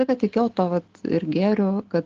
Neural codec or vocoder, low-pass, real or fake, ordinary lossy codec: none; 7.2 kHz; real; Opus, 16 kbps